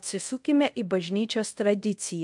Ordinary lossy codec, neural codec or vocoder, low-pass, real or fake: MP3, 64 kbps; codec, 24 kHz, 0.5 kbps, DualCodec; 10.8 kHz; fake